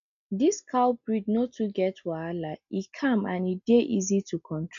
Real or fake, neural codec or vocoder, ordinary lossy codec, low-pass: real; none; MP3, 96 kbps; 7.2 kHz